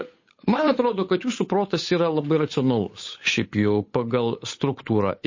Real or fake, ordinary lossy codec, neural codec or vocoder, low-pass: fake; MP3, 32 kbps; codec, 16 kHz, 8 kbps, FunCodec, trained on Chinese and English, 25 frames a second; 7.2 kHz